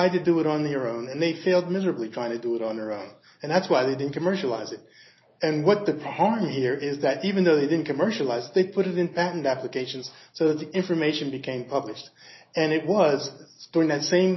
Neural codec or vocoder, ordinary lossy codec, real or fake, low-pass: none; MP3, 24 kbps; real; 7.2 kHz